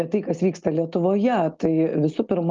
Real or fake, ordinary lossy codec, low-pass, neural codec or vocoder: real; Opus, 32 kbps; 7.2 kHz; none